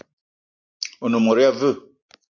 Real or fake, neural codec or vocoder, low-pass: real; none; 7.2 kHz